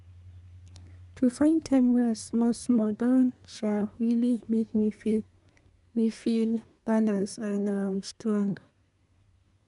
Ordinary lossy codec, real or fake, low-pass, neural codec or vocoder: none; fake; 10.8 kHz; codec, 24 kHz, 1 kbps, SNAC